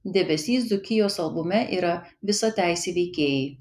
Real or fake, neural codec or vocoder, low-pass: real; none; 14.4 kHz